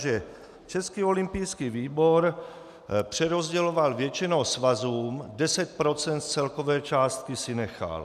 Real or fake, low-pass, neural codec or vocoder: real; 14.4 kHz; none